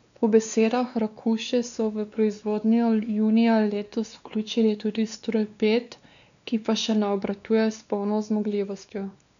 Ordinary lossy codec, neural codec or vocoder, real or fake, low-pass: none; codec, 16 kHz, 2 kbps, X-Codec, WavLM features, trained on Multilingual LibriSpeech; fake; 7.2 kHz